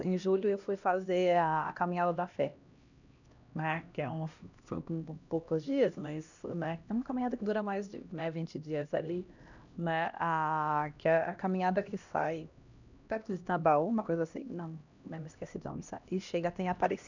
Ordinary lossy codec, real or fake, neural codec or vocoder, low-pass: none; fake; codec, 16 kHz, 1 kbps, X-Codec, HuBERT features, trained on LibriSpeech; 7.2 kHz